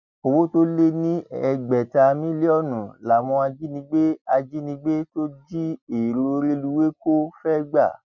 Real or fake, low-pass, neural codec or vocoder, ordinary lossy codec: real; 7.2 kHz; none; none